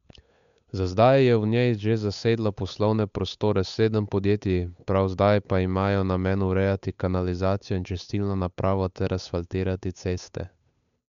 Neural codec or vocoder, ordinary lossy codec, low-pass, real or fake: codec, 16 kHz, 8 kbps, FunCodec, trained on Chinese and English, 25 frames a second; none; 7.2 kHz; fake